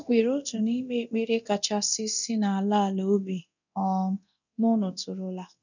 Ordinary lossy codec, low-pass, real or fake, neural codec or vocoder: none; 7.2 kHz; fake; codec, 24 kHz, 0.9 kbps, DualCodec